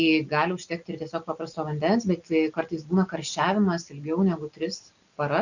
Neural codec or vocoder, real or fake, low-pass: none; real; 7.2 kHz